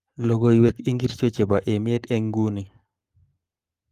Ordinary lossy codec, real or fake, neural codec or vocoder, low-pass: Opus, 32 kbps; fake; codec, 44.1 kHz, 7.8 kbps, Pupu-Codec; 14.4 kHz